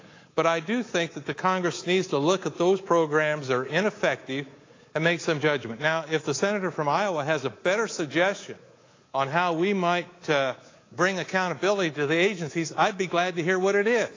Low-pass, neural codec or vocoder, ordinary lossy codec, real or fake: 7.2 kHz; codec, 24 kHz, 3.1 kbps, DualCodec; AAC, 32 kbps; fake